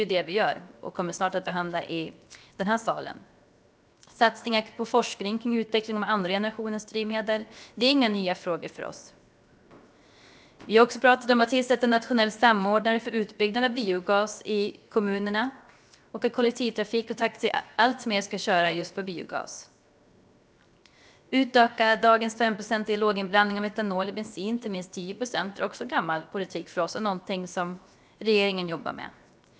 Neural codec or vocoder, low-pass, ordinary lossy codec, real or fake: codec, 16 kHz, 0.7 kbps, FocalCodec; none; none; fake